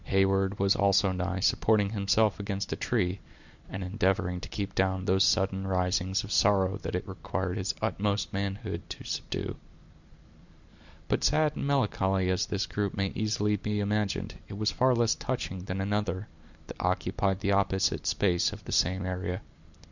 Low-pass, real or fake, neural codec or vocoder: 7.2 kHz; fake; vocoder, 44.1 kHz, 128 mel bands every 256 samples, BigVGAN v2